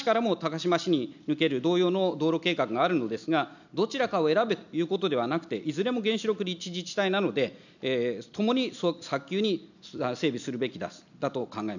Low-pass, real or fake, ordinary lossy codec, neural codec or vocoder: 7.2 kHz; real; none; none